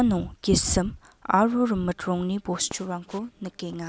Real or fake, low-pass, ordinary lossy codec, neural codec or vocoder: real; none; none; none